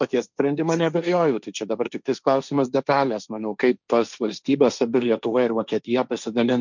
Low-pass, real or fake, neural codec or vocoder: 7.2 kHz; fake; codec, 16 kHz, 1.1 kbps, Voila-Tokenizer